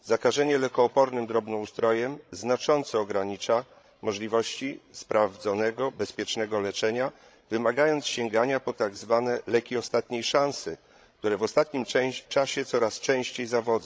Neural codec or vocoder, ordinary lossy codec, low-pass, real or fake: codec, 16 kHz, 16 kbps, FreqCodec, larger model; none; none; fake